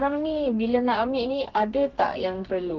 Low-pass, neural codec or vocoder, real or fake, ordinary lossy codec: 7.2 kHz; codec, 32 kHz, 1.9 kbps, SNAC; fake; Opus, 32 kbps